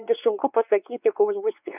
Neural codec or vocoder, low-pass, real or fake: codec, 16 kHz, 4 kbps, X-Codec, WavLM features, trained on Multilingual LibriSpeech; 3.6 kHz; fake